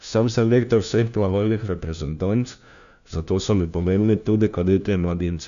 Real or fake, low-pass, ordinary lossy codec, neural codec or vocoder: fake; 7.2 kHz; none; codec, 16 kHz, 1 kbps, FunCodec, trained on LibriTTS, 50 frames a second